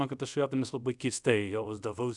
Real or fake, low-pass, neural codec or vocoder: fake; 10.8 kHz; codec, 24 kHz, 0.5 kbps, DualCodec